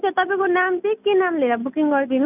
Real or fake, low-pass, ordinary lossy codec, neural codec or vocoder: real; 3.6 kHz; none; none